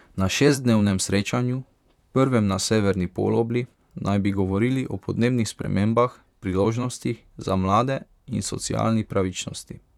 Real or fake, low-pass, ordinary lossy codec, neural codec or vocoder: fake; 19.8 kHz; none; vocoder, 44.1 kHz, 128 mel bands, Pupu-Vocoder